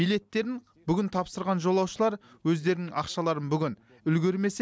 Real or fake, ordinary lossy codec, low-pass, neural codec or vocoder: real; none; none; none